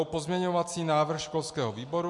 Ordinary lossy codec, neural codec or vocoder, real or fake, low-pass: MP3, 64 kbps; none; real; 10.8 kHz